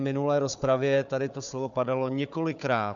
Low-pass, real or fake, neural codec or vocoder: 7.2 kHz; fake; codec, 16 kHz, 4 kbps, FunCodec, trained on Chinese and English, 50 frames a second